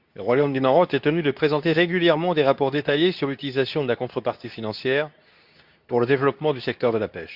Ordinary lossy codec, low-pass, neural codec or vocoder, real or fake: Opus, 64 kbps; 5.4 kHz; codec, 24 kHz, 0.9 kbps, WavTokenizer, medium speech release version 2; fake